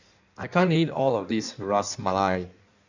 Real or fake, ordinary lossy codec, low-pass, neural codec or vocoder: fake; none; 7.2 kHz; codec, 16 kHz in and 24 kHz out, 1.1 kbps, FireRedTTS-2 codec